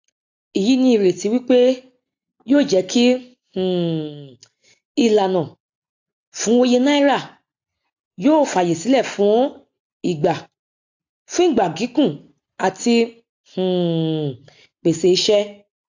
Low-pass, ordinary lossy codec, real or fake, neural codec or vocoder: 7.2 kHz; AAC, 48 kbps; real; none